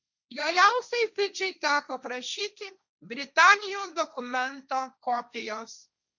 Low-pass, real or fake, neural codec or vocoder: 7.2 kHz; fake; codec, 16 kHz, 1.1 kbps, Voila-Tokenizer